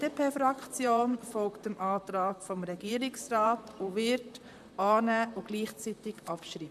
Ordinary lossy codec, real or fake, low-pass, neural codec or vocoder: MP3, 96 kbps; fake; 14.4 kHz; vocoder, 44.1 kHz, 128 mel bands, Pupu-Vocoder